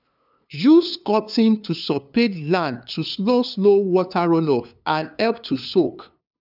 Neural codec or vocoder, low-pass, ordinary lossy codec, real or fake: codec, 16 kHz, 2 kbps, FunCodec, trained on LibriTTS, 25 frames a second; 5.4 kHz; none; fake